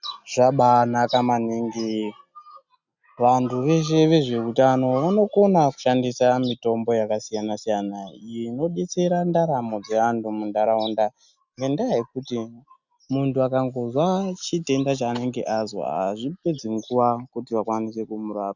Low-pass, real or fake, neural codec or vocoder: 7.2 kHz; real; none